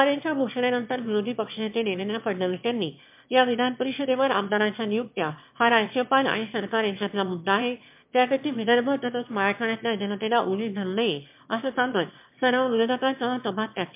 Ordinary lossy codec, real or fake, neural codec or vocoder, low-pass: MP3, 32 kbps; fake; autoencoder, 22.05 kHz, a latent of 192 numbers a frame, VITS, trained on one speaker; 3.6 kHz